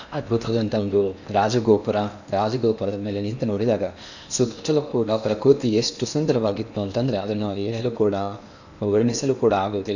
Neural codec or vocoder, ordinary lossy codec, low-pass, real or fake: codec, 16 kHz in and 24 kHz out, 0.8 kbps, FocalCodec, streaming, 65536 codes; none; 7.2 kHz; fake